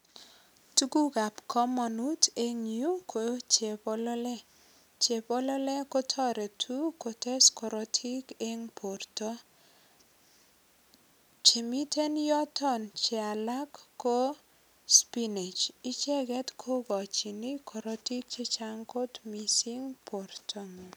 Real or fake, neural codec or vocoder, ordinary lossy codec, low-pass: real; none; none; none